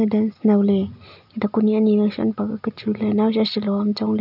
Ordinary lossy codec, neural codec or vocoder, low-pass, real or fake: none; none; 5.4 kHz; real